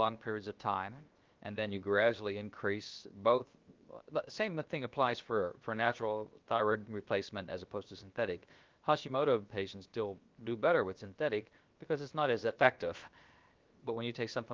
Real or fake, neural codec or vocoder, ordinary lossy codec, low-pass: fake; codec, 16 kHz, 0.7 kbps, FocalCodec; Opus, 32 kbps; 7.2 kHz